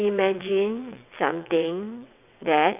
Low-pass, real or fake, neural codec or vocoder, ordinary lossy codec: 3.6 kHz; fake; vocoder, 22.05 kHz, 80 mel bands, WaveNeXt; none